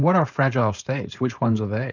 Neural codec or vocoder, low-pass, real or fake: codec, 16 kHz, 4.8 kbps, FACodec; 7.2 kHz; fake